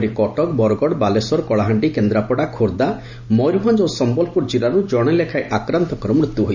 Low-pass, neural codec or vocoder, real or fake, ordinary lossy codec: 7.2 kHz; none; real; Opus, 64 kbps